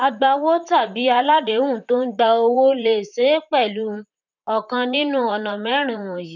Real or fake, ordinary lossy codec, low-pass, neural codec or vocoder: fake; none; 7.2 kHz; vocoder, 44.1 kHz, 128 mel bands, Pupu-Vocoder